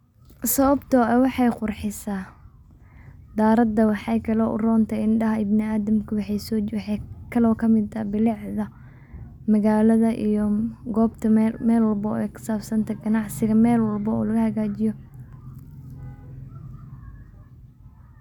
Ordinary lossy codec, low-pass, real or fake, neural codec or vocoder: none; 19.8 kHz; real; none